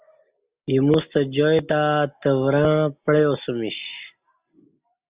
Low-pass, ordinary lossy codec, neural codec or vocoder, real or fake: 3.6 kHz; Opus, 64 kbps; none; real